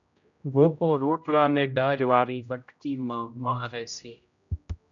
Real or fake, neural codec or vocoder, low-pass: fake; codec, 16 kHz, 0.5 kbps, X-Codec, HuBERT features, trained on general audio; 7.2 kHz